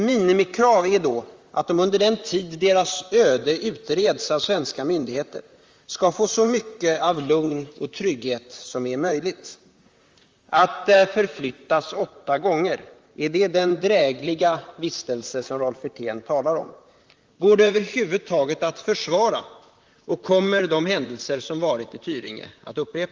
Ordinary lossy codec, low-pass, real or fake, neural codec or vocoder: Opus, 32 kbps; 7.2 kHz; fake; vocoder, 44.1 kHz, 128 mel bands, Pupu-Vocoder